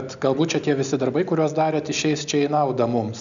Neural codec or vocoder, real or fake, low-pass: none; real; 7.2 kHz